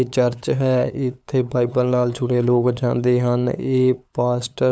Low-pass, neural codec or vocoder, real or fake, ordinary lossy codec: none; codec, 16 kHz, 8 kbps, FunCodec, trained on LibriTTS, 25 frames a second; fake; none